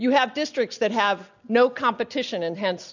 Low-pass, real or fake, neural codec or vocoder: 7.2 kHz; real; none